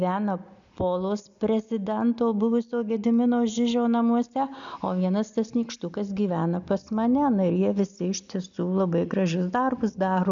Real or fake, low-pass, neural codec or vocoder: real; 7.2 kHz; none